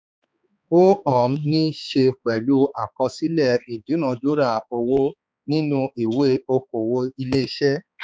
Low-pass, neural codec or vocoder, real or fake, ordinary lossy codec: none; codec, 16 kHz, 4 kbps, X-Codec, HuBERT features, trained on balanced general audio; fake; none